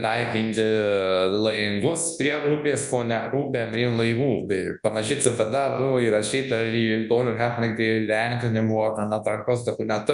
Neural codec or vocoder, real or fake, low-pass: codec, 24 kHz, 0.9 kbps, WavTokenizer, large speech release; fake; 10.8 kHz